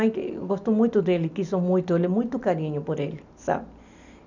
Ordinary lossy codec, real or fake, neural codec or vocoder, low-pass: none; real; none; 7.2 kHz